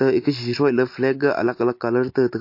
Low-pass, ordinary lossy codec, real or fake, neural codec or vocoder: 5.4 kHz; MP3, 32 kbps; real; none